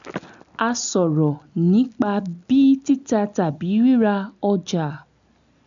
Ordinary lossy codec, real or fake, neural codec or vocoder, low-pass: MP3, 96 kbps; real; none; 7.2 kHz